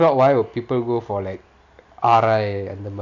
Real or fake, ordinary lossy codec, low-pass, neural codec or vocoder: real; none; 7.2 kHz; none